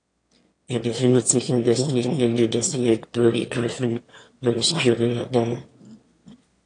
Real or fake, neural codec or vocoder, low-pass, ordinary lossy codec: fake; autoencoder, 22.05 kHz, a latent of 192 numbers a frame, VITS, trained on one speaker; 9.9 kHz; AAC, 48 kbps